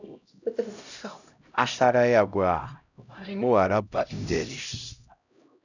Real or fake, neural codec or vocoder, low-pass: fake; codec, 16 kHz, 0.5 kbps, X-Codec, HuBERT features, trained on LibriSpeech; 7.2 kHz